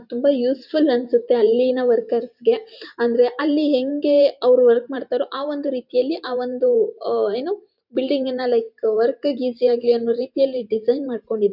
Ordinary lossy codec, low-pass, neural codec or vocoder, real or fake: none; 5.4 kHz; vocoder, 44.1 kHz, 128 mel bands every 512 samples, BigVGAN v2; fake